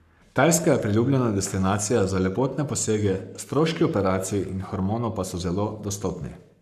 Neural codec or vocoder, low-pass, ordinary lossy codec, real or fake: codec, 44.1 kHz, 7.8 kbps, Pupu-Codec; 14.4 kHz; none; fake